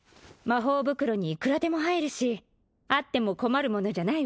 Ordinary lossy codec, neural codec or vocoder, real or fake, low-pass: none; none; real; none